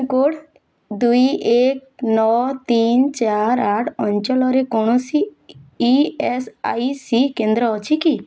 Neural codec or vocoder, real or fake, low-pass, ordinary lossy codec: none; real; none; none